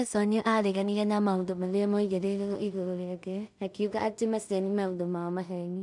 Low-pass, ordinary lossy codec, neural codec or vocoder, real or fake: 10.8 kHz; none; codec, 16 kHz in and 24 kHz out, 0.4 kbps, LongCat-Audio-Codec, two codebook decoder; fake